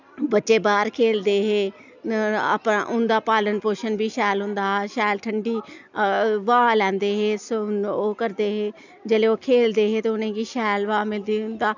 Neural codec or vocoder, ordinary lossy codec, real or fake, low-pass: none; none; real; 7.2 kHz